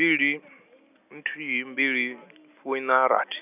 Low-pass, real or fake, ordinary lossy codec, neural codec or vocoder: 3.6 kHz; real; none; none